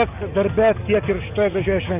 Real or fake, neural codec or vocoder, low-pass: real; none; 3.6 kHz